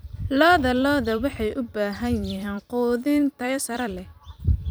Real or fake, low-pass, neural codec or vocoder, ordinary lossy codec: fake; none; vocoder, 44.1 kHz, 128 mel bands every 256 samples, BigVGAN v2; none